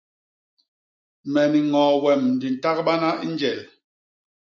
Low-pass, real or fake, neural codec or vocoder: 7.2 kHz; real; none